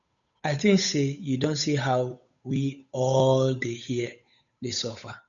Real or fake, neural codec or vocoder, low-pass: fake; codec, 16 kHz, 8 kbps, FunCodec, trained on Chinese and English, 25 frames a second; 7.2 kHz